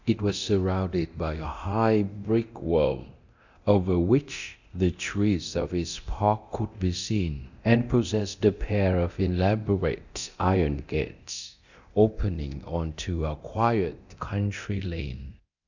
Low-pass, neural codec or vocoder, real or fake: 7.2 kHz; codec, 24 kHz, 0.9 kbps, DualCodec; fake